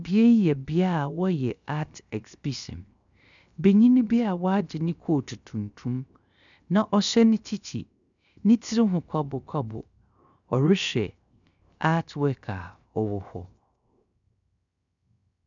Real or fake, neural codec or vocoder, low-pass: fake; codec, 16 kHz, 0.7 kbps, FocalCodec; 7.2 kHz